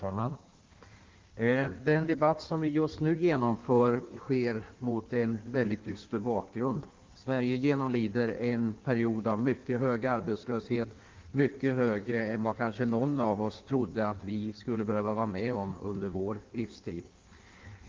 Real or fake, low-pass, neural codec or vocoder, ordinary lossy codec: fake; 7.2 kHz; codec, 16 kHz in and 24 kHz out, 1.1 kbps, FireRedTTS-2 codec; Opus, 32 kbps